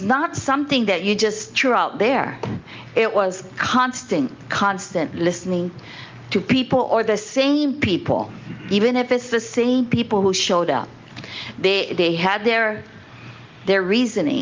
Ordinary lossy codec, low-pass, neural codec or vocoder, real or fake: Opus, 24 kbps; 7.2 kHz; none; real